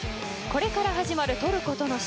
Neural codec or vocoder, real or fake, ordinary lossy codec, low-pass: none; real; none; none